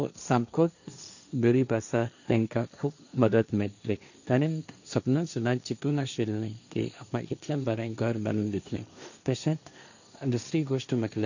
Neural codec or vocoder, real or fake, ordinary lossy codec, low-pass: codec, 16 kHz, 1.1 kbps, Voila-Tokenizer; fake; none; 7.2 kHz